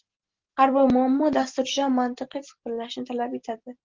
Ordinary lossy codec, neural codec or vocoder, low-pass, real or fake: Opus, 16 kbps; none; 7.2 kHz; real